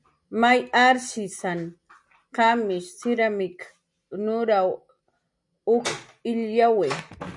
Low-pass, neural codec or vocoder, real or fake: 10.8 kHz; none; real